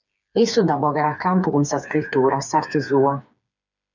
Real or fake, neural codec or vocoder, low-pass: fake; codec, 16 kHz, 4 kbps, FreqCodec, smaller model; 7.2 kHz